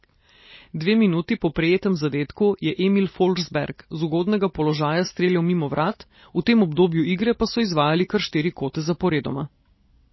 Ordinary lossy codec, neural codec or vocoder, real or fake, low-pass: MP3, 24 kbps; none; real; 7.2 kHz